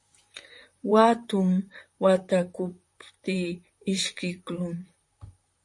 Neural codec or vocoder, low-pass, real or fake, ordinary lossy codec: vocoder, 44.1 kHz, 128 mel bands, Pupu-Vocoder; 10.8 kHz; fake; MP3, 48 kbps